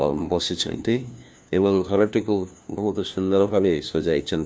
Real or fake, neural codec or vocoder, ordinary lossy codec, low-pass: fake; codec, 16 kHz, 1 kbps, FunCodec, trained on LibriTTS, 50 frames a second; none; none